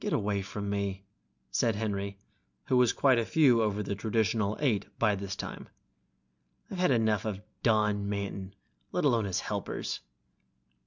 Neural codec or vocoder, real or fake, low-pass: none; real; 7.2 kHz